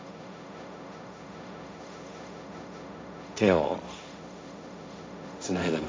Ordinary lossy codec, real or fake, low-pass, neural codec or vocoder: none; fake; none; codec, 16 kHz, 1.1 kbps, Voila-Tokenizer